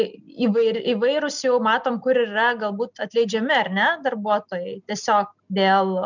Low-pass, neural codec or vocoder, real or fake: 7.2 kHz; none; real